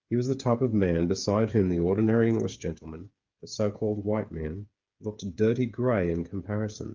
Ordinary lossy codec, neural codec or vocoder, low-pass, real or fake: Opus, 24 kbps; codec, 16 kHz, 8 kbps, FreqCodec, smaller model; 7.2 kHz; fake